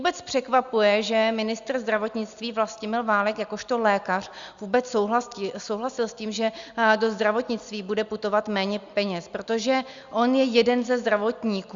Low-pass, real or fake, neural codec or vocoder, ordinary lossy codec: 7.2 kHz; real; none; Opus, 64 kbps